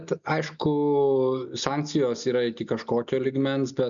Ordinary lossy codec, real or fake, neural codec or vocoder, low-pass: MP3, 96 kbps; real; none; 7.2 kHz